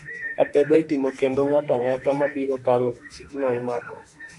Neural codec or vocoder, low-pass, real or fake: autoencoder, 48 kHz, 32 numbers a frame, DAC-VAE, trained on Japanese speech; 10.8 kHz; fake